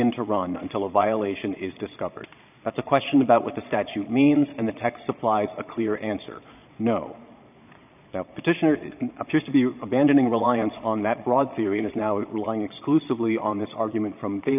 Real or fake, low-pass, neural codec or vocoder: fake; 3.6 kHz; vocoder, 22.05 kHz, 80 mel bands, Vocos